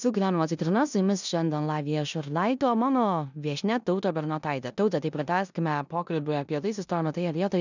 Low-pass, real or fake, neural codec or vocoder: 7.2 kHz; fake; codec, 16 kHz in and 24 kHz out, 0.9 kbps, LongCat-Audio-Codec, four codebook decoder